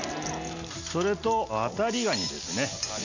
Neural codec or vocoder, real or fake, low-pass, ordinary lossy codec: none; real; 7.2 kHz; none